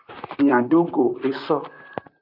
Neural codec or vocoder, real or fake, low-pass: vocoder, 44.1 kHz, 128 mel bands, Pupu-Vocoder; fake; 5.4 kHz